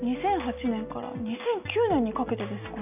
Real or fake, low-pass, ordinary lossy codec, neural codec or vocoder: real; 3.6 kHz; none; none